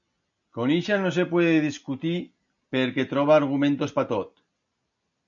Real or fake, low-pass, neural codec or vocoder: real; 7.2 kHz; none